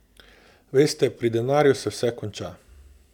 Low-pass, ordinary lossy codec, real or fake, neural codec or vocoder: 19.8 kHz; none; real; none